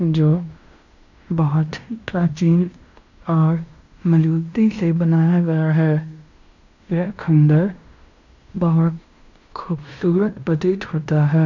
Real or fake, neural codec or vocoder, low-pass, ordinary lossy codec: fake; codec, 16 kHz in and 24 kHz out, 0.9 kbps, LongCat-Audio-Codec, fine tuned four codebook decoder; 7.2 kHz; none